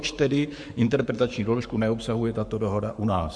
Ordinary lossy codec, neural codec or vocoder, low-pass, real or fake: MP3, 64 kbps; codec, 44.1 kHz, 7.8 kbps, Pupu-Codec; 9.9 kHz; fake